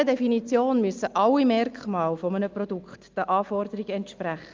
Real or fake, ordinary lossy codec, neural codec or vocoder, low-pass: real; Opus, 24 kbps; none; 7.2 kHz